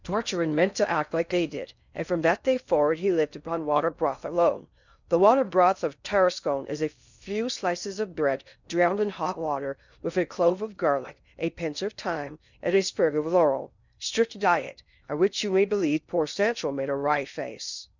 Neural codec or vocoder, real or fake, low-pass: codec, 16 kHz in and 24 kHz out, 0.6 kbps, FocalCodec, streaming, 2048 codes; fake; 7.2 kHz